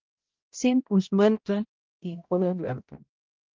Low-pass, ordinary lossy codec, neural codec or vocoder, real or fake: 7.2 kHz; Opus, 32 kbps; codec, 16 kHz, 0.5 kbps, X-Codec, HuBERT features, trained on balanced general audio; fake